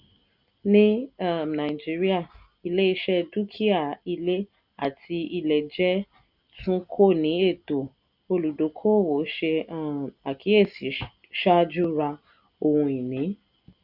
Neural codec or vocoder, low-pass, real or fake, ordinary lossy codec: none; 5.4 kHz; real; none